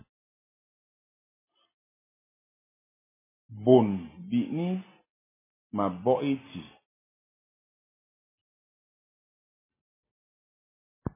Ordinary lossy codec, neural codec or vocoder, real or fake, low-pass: MP3, 16 kbps; none; real; 3.6 kHz